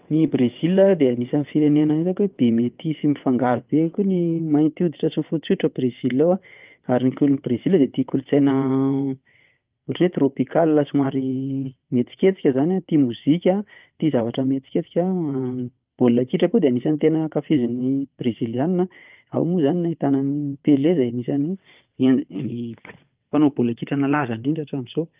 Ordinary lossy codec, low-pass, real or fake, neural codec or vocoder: Opus, 32 kbps; 3.6 kHz; fake; vocoder, 22.05 kHz, 80 mel bands, WaveNeXt